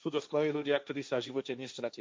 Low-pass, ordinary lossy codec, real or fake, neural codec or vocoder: none; none; fake; codec, 16 kHz, 1.1 kbps, Voila-Tokenizer